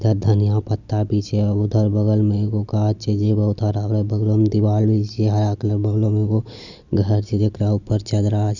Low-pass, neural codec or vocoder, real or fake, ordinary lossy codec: 7.2 kHz; none; real; Opus, 64 kbps